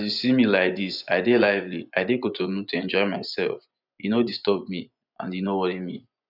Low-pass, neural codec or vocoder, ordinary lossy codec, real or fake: 5.4 kHz; vocoder, 24 kHz, 100 mel bands, Vocos; none; fake